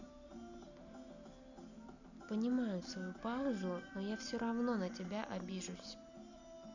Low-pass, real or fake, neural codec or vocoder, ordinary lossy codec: 7.2 kHz; real; none; none